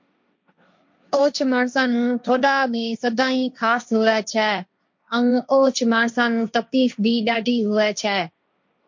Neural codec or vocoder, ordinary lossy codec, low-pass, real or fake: codec, 16 kHz, 1.1 kbps, Voila-Tokenizer; MP3, 48 kbps; 7.2 kHz; fake